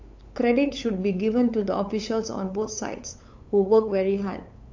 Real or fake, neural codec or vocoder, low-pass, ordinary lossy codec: fake; codec, 16 kHz, 8 kbps, FunCodec, trained on LibriTTS, 25 frames a second; 7.2 kHz; AAC, 48 kbps